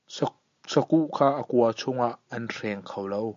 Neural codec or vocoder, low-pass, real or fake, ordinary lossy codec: none; 7.2 kHz; real; MP3, 48 kbps